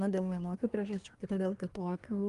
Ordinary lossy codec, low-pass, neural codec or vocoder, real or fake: Opus, 32 kbps; 10.8 kHz; codec, 24 kHz, 1 kbps, SNAC; fake